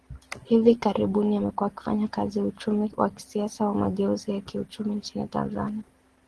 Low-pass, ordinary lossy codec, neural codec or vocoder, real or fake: 10.8 kHz; Opus, 16 kbps; none; real